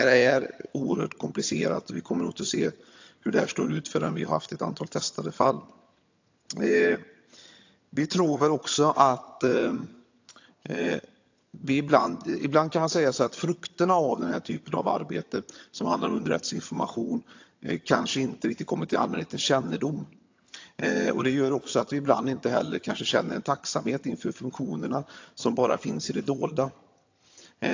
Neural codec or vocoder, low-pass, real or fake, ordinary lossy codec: vocoder, 22.05 kHz, 80 mel bands, HiFi-GAN; 7.2 kHz; fake; AAC, 48 kbps